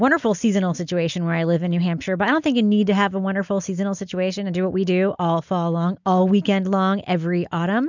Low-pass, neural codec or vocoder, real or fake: 7.2 kHz; none; real